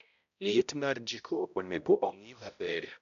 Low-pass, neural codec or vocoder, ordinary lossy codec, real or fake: 7.2 kHz; codec, 16 kHz, 0.5 kbps, X-Codec, HuBERT features, trained on balanced general audio; none; fake